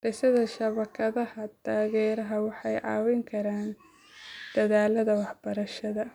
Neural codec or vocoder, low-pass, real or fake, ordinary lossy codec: none; 19.8 kHz; real; none